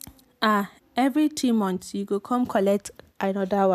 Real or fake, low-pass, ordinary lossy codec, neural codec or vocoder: real; 14.4 kHz; none; none